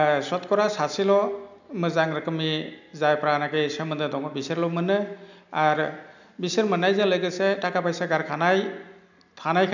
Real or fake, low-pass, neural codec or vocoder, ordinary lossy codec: real; 7.2 kHz; none; none